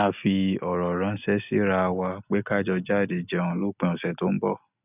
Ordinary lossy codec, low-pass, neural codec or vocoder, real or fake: none; 3.6 kHz; none; real